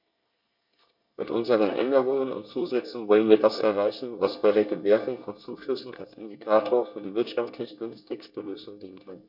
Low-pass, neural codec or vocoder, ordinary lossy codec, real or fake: 5.4 kHz; codec, 24 kHz, 1 kbps, SNAC; none; fake